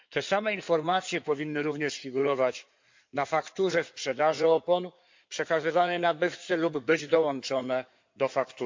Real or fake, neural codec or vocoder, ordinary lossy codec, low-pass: fake; codec, 16 kHz in and 24 kHz out, 2.2 kbps, FireRedTTS-2 codec; MP3, 48 kbps; 7.2 kHz